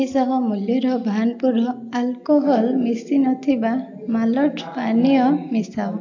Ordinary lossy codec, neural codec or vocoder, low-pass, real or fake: AAC, 48 kbps; none; 7.2 kHz; real